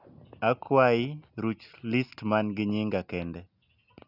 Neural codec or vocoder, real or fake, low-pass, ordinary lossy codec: none; real; 5.4 kHz; none